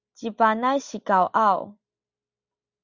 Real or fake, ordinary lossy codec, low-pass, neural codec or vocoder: real; Opus, 64 kbps; 7.2 kHz; none